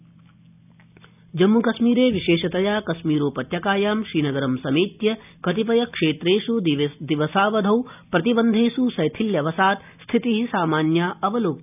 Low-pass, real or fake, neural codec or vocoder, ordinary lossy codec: 3.6 kHz; real; none; none